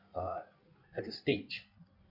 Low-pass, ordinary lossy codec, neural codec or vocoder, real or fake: 5.4 kHz; none; codec, 16 kHz in and 24 kHz out, 1.1 kbps, FireRedTTS-2 codec; fake